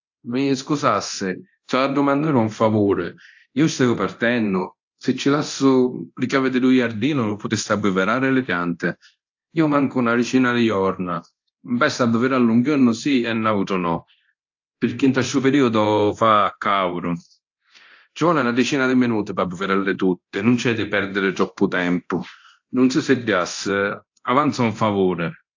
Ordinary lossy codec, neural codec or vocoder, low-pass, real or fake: AAC, 48 kbps; codec, 24 kHz, 0.9 kbps, DualCodec; 7.2 kHz; fake